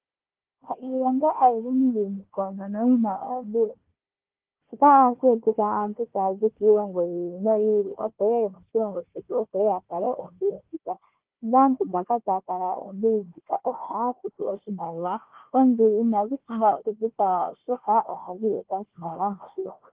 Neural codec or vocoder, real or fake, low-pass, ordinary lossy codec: codec, 16 kHz, 1 kbps, FunCodec, trained on Chinese and English, 50 frames a second; fake; 3.6 kHz; Opus, 16 kbps